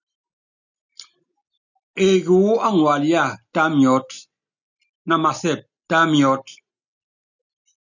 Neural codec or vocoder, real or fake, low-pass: none; real; 7.2 kHz